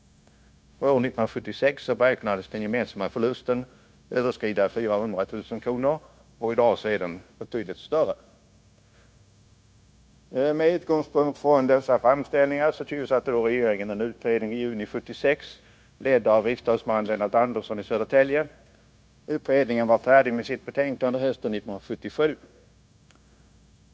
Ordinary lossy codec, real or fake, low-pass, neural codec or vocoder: none; fake; none; codec, 16 kHz, 0.9 kbps, LongCat-Audio-Codec